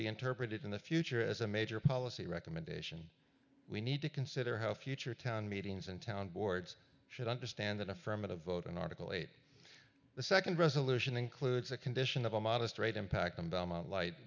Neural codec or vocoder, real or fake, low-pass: none; real; 7.2 kHz